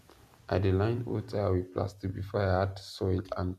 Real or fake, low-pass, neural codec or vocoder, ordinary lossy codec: real; 14.4 kHz; none; MP3, 96 kbps